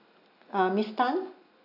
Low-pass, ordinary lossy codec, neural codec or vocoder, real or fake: 5.4 kHz; none; none; real